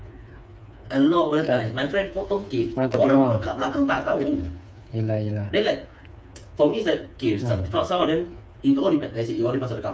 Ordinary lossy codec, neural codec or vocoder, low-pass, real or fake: none; codec, 16 kHz, 4 kbps, FreqCodec, smaller model; none; fake